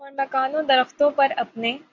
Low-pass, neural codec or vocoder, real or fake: 7.2 kHz; none; real